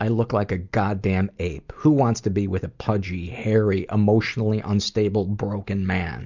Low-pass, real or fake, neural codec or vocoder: 7.2 kHz; real; none